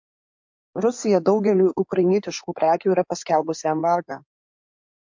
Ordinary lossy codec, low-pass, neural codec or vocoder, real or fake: MP3, 48 kbps; 7.2 kHz; codec, 16 kHz in and 24 kHz out, 2.2 kbps, FireRedTTS-2 codec; fake